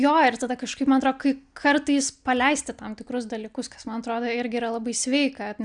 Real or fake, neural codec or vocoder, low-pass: real; none; 9.9 kHz